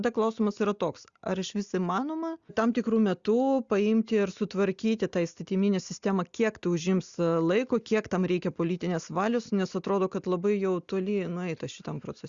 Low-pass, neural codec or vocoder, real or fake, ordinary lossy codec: 7.2 kHz; none; real; Opus, 64 kbps